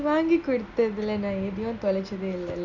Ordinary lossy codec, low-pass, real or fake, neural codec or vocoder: none; 7.2 kHz; real; none